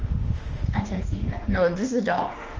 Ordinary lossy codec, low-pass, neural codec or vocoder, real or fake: Opus, 24 kbps; 7.2 kHz; autoencoder, 48 kHz, 32 numbers a frame, DAC-VAE, trained on Japanese speech; fake